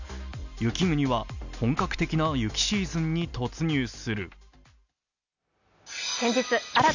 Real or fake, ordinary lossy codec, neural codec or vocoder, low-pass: real; none; none; 7.2 kHz